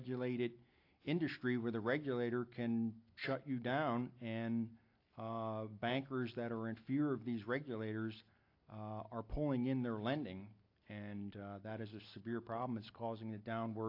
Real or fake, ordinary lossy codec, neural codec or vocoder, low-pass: real; AAC, 32 kbps; none; 5.4 kHz